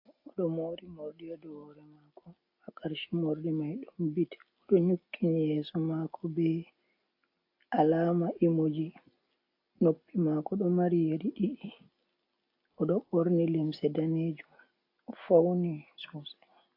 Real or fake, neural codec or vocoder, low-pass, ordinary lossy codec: real; none; 5.4 kHz; AAC, 32 kbps